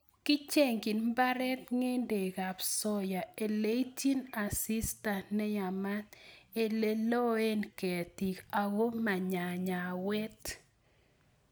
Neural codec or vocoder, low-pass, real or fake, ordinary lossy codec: none; none; real; none